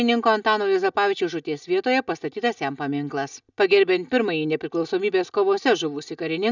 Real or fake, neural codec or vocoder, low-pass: real; none; 7.2 kHz